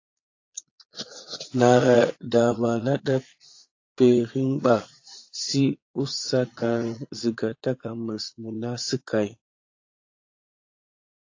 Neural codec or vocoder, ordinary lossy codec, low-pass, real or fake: vocoder, 22.05 kHz, 80 mel bands, Vocos; AAC, 48 kbps; 7.2 kHz; fake